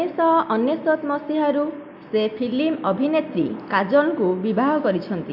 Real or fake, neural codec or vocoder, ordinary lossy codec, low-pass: real; none; AAC, 48 kbps; 5.4 kHz